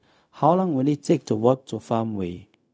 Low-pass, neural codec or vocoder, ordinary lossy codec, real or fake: none; codec, 16 kHz, 0.4 kbps, LongCat-Audio-Codec; none; fake